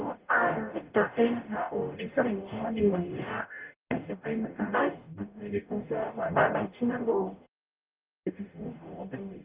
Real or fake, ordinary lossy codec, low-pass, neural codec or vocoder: fake; Opus, 32 kbps; 3.6 kHz; codec, 44.1 kHz, 0.9 kbps, DAC